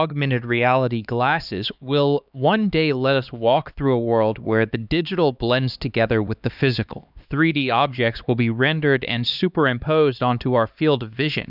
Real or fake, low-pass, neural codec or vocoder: fake; 5.4 kHz; codec, 16 kHz, 2 kbps, X-Codec, HuBERT features, trained on LibriSpeech